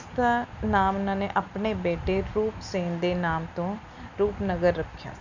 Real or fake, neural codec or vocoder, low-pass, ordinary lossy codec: real; none; 7.2 kHz; none